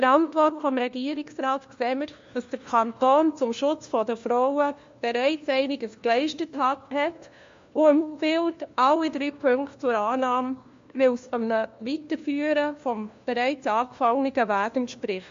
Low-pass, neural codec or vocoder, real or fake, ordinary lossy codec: 7.2 kHz; codec, 16 kHz, 1 kbps, FunCodec, trained on LibriTTS, 50 frames a second; fake; MP3, 48 kbps